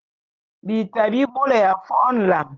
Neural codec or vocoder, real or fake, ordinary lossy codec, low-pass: none; real; Opus, 16 kbps; 7.2 kHz